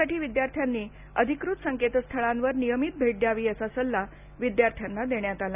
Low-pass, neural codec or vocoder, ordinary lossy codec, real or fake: 3.6 kHz; none; none; real